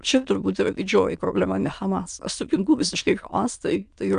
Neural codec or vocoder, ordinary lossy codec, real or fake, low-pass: autoencoder, 22.05 kHz, a latent of 192 numbers a frame, VITS, trained on many speakers; AAC, 96 kbps; fake; 9.9 kHz